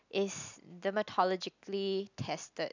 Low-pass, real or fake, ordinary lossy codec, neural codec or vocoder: 7.2 kHz; real; none; none